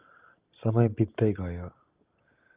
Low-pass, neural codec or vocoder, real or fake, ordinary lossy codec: 3.6 kHz; none; real; Opus, 32 kbps